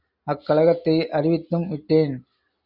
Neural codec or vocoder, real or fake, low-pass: none; real; 5.4 kHz